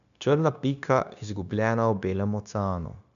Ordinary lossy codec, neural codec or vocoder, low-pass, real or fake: none; codec, 16 kHz, 0.9 kbps, LongCat-Audio-Codec; 7.2 kHz; fake